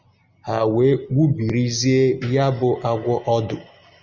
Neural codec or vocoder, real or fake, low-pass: none; real; 7.2 kHz